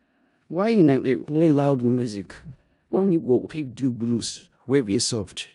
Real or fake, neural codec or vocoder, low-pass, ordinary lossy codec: fake; codec, 16 kHz in and 24 kHz out, 0.4 kbps, LongCat-Audio-Codec, four codebook decoder; 10.8 kHz; none